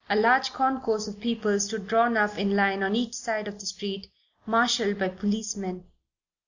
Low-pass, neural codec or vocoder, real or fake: 7.2 kHz; none; real